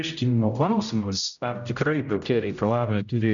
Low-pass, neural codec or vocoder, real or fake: 7.2 kHz; codec, 16 kHz, 0.5 kbps, X-Codec, HuBERT features, trained on general audio; fake